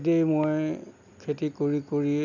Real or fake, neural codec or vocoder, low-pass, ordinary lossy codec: real; none; 7.2 kHz; none